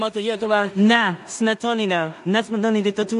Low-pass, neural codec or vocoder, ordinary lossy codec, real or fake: 10.8 kHz; codec, 16 kHz in and 24 kHz out, 0.4 kbps, LongCat-Audio-Codec, two codebook decoder; AAC, 64 kbps; fake